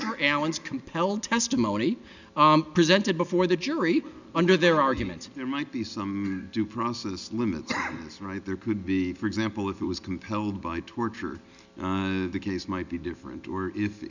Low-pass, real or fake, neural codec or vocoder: 7.2 kHz; real; none